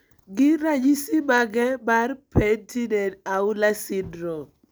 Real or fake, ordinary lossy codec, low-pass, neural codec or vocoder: real; none; none; none